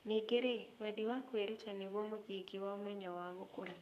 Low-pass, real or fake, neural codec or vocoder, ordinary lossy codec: 14.4 kHz; fake; codec, 32 kHz, 1.9 kbps, SNAC; none